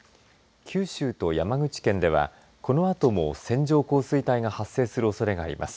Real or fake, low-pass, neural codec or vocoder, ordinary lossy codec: real; none; none; none